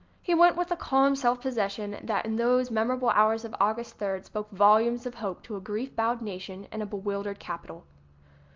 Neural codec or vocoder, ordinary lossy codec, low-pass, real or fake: none; Opus, 24 kbps; 7.2 kHz; real